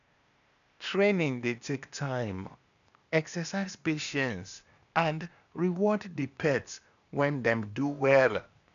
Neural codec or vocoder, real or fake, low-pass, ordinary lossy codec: codec, 16 kHz, 0.8 kbps, ZipCodec; fake; 7.2 kHz; none